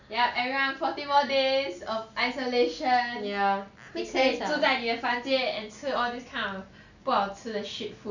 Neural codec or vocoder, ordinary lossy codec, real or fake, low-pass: none; none; real; 7.2 kHz